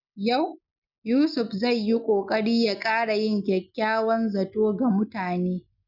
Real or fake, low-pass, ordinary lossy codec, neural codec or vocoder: real; 5.4 kHz; none; none